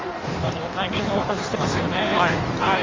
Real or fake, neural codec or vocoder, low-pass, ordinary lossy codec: fake; codec, 16 kHz in and 24 kHz out, 1.1 kbps, FireRedTTS-2 codec; 7.2 kHz; Opus, 32 kbps